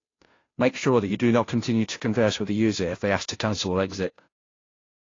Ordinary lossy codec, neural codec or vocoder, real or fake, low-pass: AAC, 32 kbps; codec, 16 kHz, 0.5 kbps, FunCodec, trained on Chinese and English, 25 frames a second; fake; 7.2 kHz